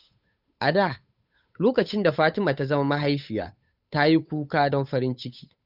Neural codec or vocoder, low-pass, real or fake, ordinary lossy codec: codec, 16 kHz, 8 kbps, FunCodec, trained on Chinese and English, 25 frames a second; 5.4 kHz; fake; none